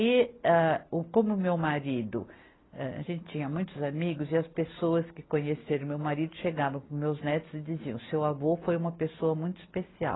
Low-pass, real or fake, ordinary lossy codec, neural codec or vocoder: 7.2 kHz; real; AAC, 16 kbps; none